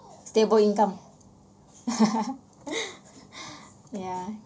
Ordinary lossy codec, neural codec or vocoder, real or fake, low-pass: none; none; real; none